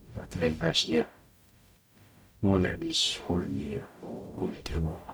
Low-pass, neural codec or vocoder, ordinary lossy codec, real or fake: none; codec, 44.1 kHz, 0.9 kbps, DAC; none; fake